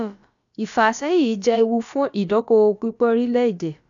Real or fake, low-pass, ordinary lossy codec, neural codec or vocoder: fake; 7.2 kHz; none; codec, 16 kHz, about 1 kbps, DyCAST, with the encoder's durations